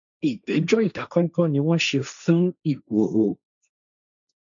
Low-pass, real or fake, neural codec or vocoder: 7.2 kHz; fake; codec, 16 kHz, 1.1 kbps, Voila-Tokenizer